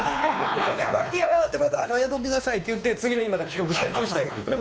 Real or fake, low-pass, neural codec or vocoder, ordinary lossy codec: fake; none; codec, 16 kHz, 2 kbps, X-Codec, WavLM features, trained on Multilingual LibriSpeech; none